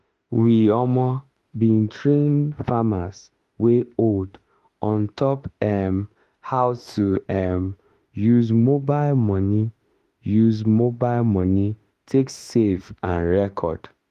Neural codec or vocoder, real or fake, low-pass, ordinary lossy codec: autoencoder, 48 kHz, 32 numbers a frame, DAC-VAE, trained on Japanese speech; fake; 14.4 kHz; Opus, 24 kbps